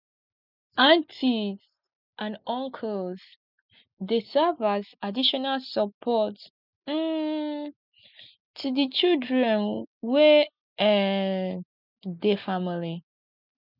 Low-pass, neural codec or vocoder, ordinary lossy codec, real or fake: 5.4 kHz; none; none; real